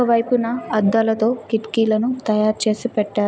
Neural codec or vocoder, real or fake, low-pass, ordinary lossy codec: none; real; none; none